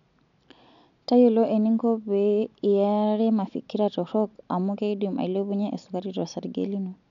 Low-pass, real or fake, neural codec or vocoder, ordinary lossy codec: 7.2 kHz; real; none; none